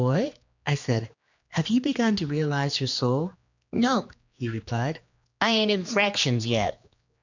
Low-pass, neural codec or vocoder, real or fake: 7.2 kHz; codec, 16 kHz, 2 kbps, X-Codec, HuBERT features, trained on general audio; fake